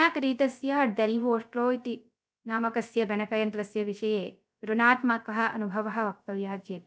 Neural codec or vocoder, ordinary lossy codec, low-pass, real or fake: codec, 16 kHz, 0.3 kbps, FocalCodec; none; none; fake